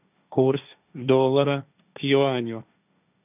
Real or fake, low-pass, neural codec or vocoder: fake; 3.6 kHz; codec, 16 kHz, 1.1 kbps, Voila-Tokenizer